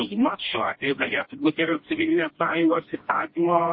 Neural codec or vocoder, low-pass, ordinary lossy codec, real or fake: codec, 16 kHz, 1 kbps, FreqCodec, smaller model; 7.2 kHz; MP3, 24 kbps; fake